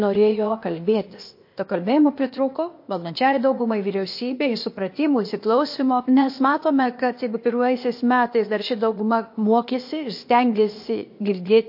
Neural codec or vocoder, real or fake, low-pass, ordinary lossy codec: codec, 16 kHz, 0.8 kbps, ZipCodec; fake; 5.4 kHz; MP3, 32 kbps